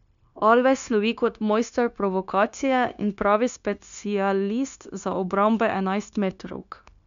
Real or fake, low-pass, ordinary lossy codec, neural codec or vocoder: fake; 7.2 kHz; none; codec, 16 kHz, 0.9 kbps, LongCat-Audio-Codec